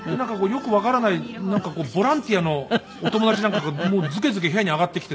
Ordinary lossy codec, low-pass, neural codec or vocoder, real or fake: none; none; none; real